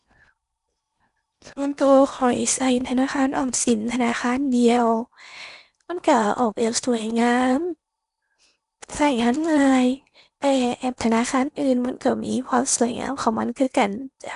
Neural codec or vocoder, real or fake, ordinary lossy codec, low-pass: codec, 16 kHz in and 24 kHz out, 0.8 kbps, FocalCodec, streaming, 65536 codes; fake; none; 10.8 kHz